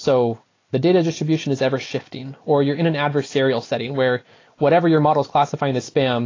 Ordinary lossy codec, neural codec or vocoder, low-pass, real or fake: AAC, 32 kbps; none; 7.2 kHz; real